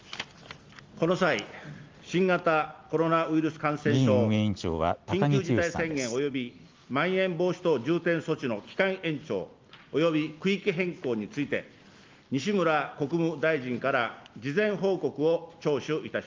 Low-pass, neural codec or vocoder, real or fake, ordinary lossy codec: 7.2 kHz; none; real; Opus, 32 kbps